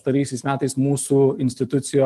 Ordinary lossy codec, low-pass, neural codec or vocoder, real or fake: Opus, 32 kbps; 14.4 kHz; none; real